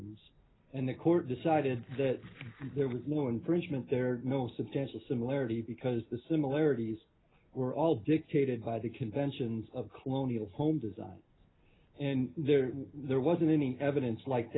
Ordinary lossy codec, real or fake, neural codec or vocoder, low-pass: AAC, 16 kbps; real; none; 7.2 kHz